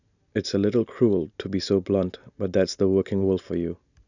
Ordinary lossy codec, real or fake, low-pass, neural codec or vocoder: none; real; 7.2 kHz; none